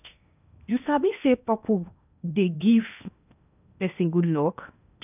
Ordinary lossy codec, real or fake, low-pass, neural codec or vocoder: none; fake; 3.6 kHz; codec, 16 kHz in and 24 kHz out, 0.8 kbps, FocalCodec, streaming, 65536 codes